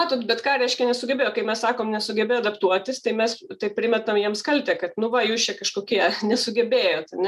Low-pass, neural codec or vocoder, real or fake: 14.4 kHz; none; real